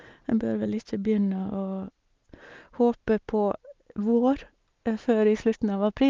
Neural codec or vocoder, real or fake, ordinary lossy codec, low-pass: none; real; Opus, 32 kbps; 7.2 kHz